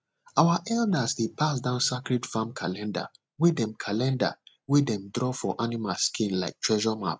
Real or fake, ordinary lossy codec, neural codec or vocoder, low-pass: real; none; none; none